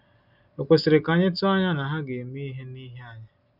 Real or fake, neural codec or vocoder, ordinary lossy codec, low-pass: real; none; none; 5.4 kHz